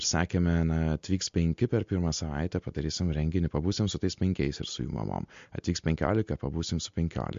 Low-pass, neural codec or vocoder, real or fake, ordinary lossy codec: 7.2 kHz; none; real; MP3, 48 kbps